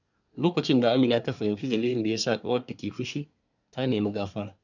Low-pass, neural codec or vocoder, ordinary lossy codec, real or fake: 7.2 kHz; codec, 24 kHz, 1 kbps, SNAC; none; fake